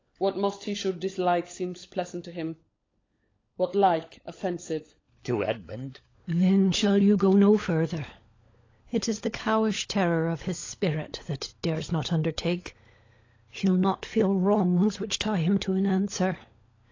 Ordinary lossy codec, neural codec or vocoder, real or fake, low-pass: AAC, 32 kbps; codec, 16 kHz, 16 kbps, FunCodec, trained on LibriTTS, 50 frames a second; fake; 7.2 kHz